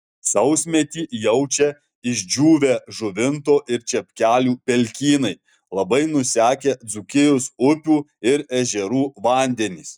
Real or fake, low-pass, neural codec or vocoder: real; 19.8 kHz; none